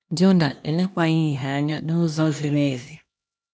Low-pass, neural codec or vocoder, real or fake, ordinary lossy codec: none; codec, 16 kHz, 1 kbps, X-Codec, HuBERT features, trained on LibriSpeech; fake; none